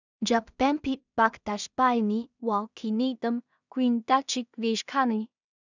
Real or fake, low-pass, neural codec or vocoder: fake; 7.2 kHz; codec, 16 kHz in and 24 kHz out, 0.4 kbps, LongCat-Audio-Codec, two codebook decoder